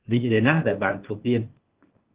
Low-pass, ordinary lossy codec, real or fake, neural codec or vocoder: 3.6 kHz; Opus, 16 kbps; fake; codec, 16 kHz, 0.8 kbps, ZipCodec